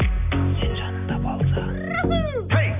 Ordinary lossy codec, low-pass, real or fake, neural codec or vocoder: none; 3.6 kHz; real; none